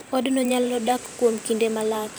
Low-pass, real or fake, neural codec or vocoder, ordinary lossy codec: none; fake; vocoder, 44.1 kHz, 128 mel bands every 512 samples, BigVGAN v2; none